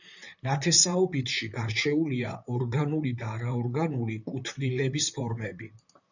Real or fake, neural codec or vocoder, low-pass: fake; vocoder, 44.1 kHz, 128 mel bands, Pupu-Vocoder; 7.2 kHz